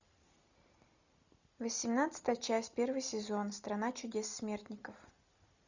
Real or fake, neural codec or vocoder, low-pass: real; none; 7.2 kHz